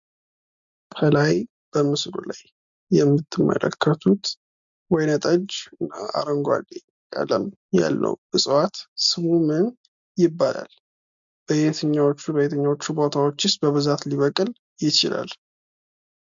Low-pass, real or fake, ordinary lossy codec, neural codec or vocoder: 7.2 kHz; real; MP3, 64 kbps; none